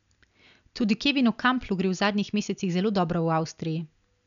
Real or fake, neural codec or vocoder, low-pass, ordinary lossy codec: real; none; 7.2 kHz; none